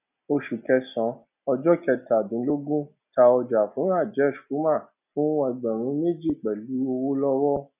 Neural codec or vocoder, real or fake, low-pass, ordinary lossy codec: none; real; 3.6 kHz; none